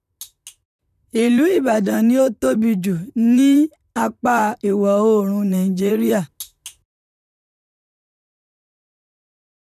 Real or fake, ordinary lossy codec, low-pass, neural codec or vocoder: fake; none; 14.4 kHz; vocoder, 44.1 kHz, 128 mel bands, Pupu-Vocoder